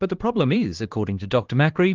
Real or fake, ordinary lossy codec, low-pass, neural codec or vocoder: fake; Opus, 16 kbps; 7.2 kHz; codec, 16 kHz, 2 kbps, X-Codec, HuBERT features, trained on LibriSpeech